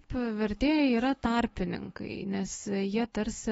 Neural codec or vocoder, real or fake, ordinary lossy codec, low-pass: autoencoder, 48 kHz, 128 numbers a frame, DAC-VAE, trained on Japanese speech; fake; AAC, 24 kbps; 19.8 kHz